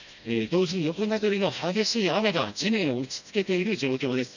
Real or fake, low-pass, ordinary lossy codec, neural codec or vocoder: fake; 7.2 kHz; none; codec, 16 kHz, 1 kbps, FreqCodec, smaller model